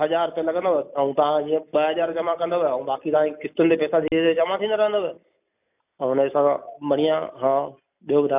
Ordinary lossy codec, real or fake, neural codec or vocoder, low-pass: none; real; none; 3.6 kHz